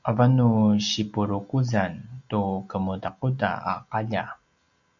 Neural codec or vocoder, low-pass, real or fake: none; 7.2 kHz; real